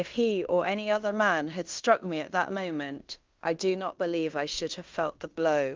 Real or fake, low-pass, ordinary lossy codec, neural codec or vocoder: fake; 7.2 kHz; Opus, 24 kbps; codec, 16 kHz in and 24 kHz out, 0.9 kbps, LongCat-Audio-Codec, fine tuned four codebook decoder